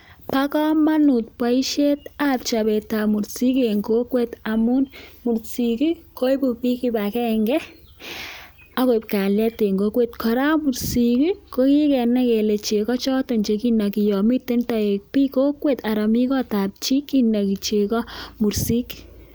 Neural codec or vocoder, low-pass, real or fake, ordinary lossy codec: none; none; real; none